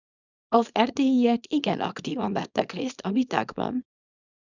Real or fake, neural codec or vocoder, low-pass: fake; codec, 24 kHz, 0.9 kbps, WavTokenizer, small release; 7.2 kHz